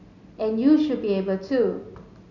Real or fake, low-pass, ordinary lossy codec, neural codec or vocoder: real; 7.2 kHz; none; none